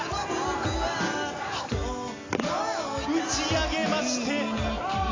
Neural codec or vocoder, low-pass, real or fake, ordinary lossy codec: none; 7.2 kHz; real; AAC, 32 kbps